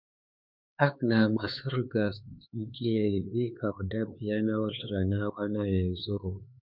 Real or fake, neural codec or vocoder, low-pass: fake; codec, 16 kHz, 4 kbps, X-Codec, HuBERT features, trained on LibriSpeech; 5.4 kHz